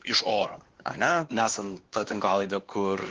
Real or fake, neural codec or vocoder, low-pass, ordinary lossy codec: fake; codec, 16 kHz, 2 kbps, X-Codec, WavLM features, trained on Multilingual LibriSpeech; 7.2 kHz; Opus, 16 kbps